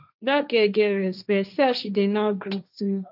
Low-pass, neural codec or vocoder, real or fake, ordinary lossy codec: 5.4 kHz; codec, 16 kHz, 1.1 kbps, Voila-Tokenizer; fake; none